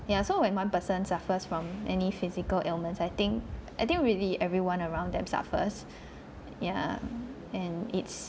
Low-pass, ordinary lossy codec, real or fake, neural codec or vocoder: none; none; real; none